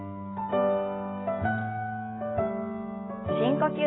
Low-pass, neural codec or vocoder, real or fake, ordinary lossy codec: 7.2 kHz; none; real; AAC, 16 kbps